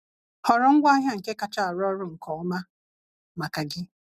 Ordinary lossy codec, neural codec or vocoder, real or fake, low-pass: none; none; real; 14.4 kHz